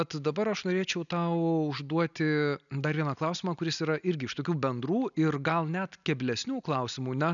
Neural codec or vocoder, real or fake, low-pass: none; real; 7.2 kHz